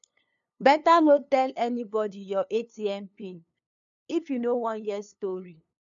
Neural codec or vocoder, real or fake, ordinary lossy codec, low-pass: codec, 16 kHz, 2 kbps, FunCodec, trained on LibriTTS, 25 frames a second; fake; none; 7.2 kHz